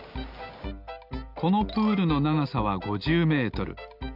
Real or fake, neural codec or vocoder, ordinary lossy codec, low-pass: real; none; none; 5.4 kHz